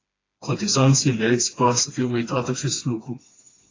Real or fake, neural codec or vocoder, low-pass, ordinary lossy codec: fake; codec, 16 kHz, 2 kbps, FreqCodec, smaller model; 7.2 kHz; AAC, 32 kbps